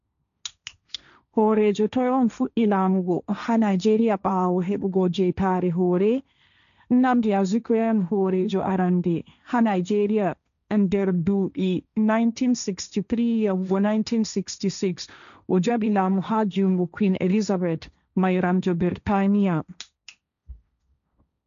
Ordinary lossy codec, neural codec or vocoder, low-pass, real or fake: none; codec, 16 kHz, 1.1 kbps, Voila-Tokenizer; 7.2 kHz; fake